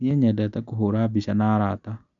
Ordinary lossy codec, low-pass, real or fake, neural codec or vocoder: none; 7.2 kHz; real; none